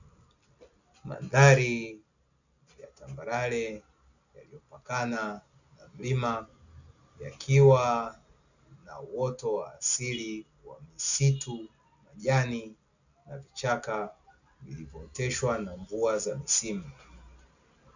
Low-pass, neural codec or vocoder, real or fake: 7.2 kHz; none; real